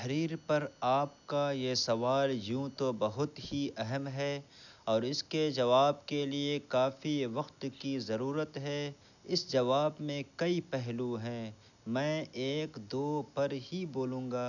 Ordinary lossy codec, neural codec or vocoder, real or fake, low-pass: none; none; real; 7.2 kHz